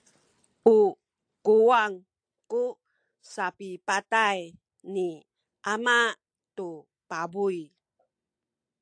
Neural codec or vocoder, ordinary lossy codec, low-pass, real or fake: none; AAC, 64 kbps; 9.9 kHz; real